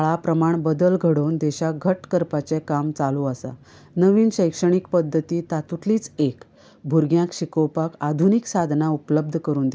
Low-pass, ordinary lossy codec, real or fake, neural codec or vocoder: none; none; real; none